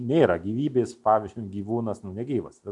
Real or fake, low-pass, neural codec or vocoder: real; 10.8 kHz; none